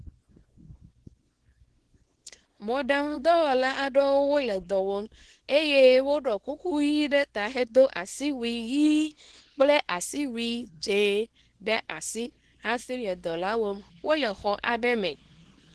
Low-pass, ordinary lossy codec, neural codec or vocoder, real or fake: 10.8 kHz; Opus, 16 kbps; codec, 24 kHz, 0.9 kbps, WavTokenizer, small release; fake